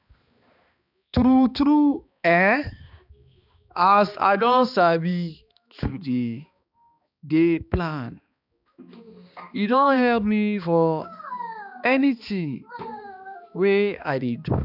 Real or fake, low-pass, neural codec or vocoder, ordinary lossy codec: fake; 5.4 kHz; codec, 16 kHz, 2 kbps, X-Codec, HuBERT features, trained on balanced general audio; none